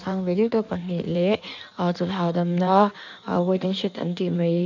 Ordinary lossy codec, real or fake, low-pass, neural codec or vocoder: none; fake; 7.2 kHz; codec, 16 kHz in and 24 kHz out, 1.1 kbps, FireRedTTS-2 codec